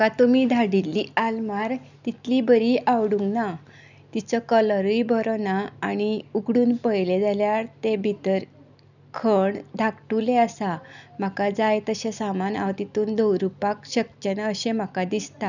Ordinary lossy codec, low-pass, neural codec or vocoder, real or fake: none; 7.2 kHz; none; real